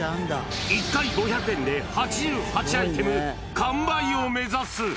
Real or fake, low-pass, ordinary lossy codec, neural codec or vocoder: real; none; none; none